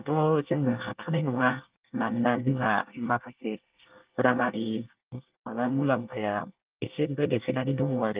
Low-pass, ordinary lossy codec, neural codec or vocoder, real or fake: 3.6 kHz; Opus, 32 kbps; codec, 24 kHz, 1 kbps, SNAC; fake